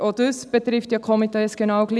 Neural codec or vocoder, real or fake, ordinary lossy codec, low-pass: none; real; none; none